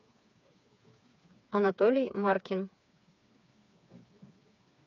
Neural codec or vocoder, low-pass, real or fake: codec, 16 kHz, 4 kbps, FreqCodec, smaller model; 7.2 kHz; fake